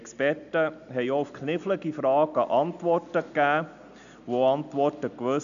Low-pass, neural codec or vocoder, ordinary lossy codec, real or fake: 7.2 kHz; none; none; real